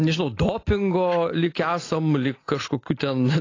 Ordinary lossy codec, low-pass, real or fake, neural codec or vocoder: AAC, 32 kbps; 7.2 kHz; real; none